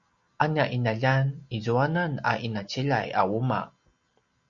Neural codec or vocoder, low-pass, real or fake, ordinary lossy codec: none; 7.2 kHz; real; Opus, 64 kbps